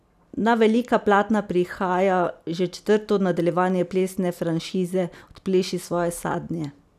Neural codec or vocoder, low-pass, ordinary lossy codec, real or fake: none; 14.4 kHz; none; real